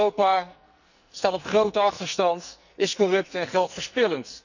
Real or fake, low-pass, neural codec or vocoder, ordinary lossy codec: fake; 7.2 kHz; codec, 44.1 kHz, 2.6 kbps, SNAC; none